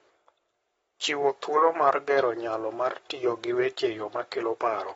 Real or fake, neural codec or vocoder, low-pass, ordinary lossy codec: fake; codec, 44.1 kHz, 7.8 kbps, Pupu-Codec; 19.8 kHz; AAC, 24 kbps